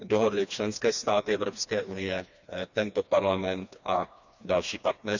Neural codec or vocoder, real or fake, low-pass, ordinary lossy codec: codec, 16 kHz, 2 kbps, FreqCodec, smaller model; fake; 7.2 kHz; none